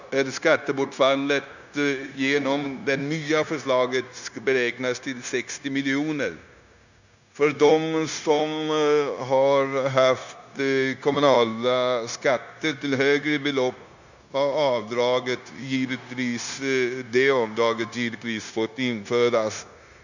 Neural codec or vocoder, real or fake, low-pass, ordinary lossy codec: codec, 16 kHz, 0.9 kbps, LongCat-Audio-Codec; fake; 7.2 kHz; none